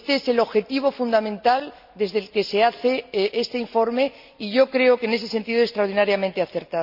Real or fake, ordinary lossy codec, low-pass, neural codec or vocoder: real; none; 5.4 kHz; none